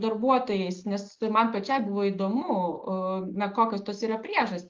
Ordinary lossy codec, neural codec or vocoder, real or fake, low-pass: Opus, 32 kbps; none; real; 7.2 kHz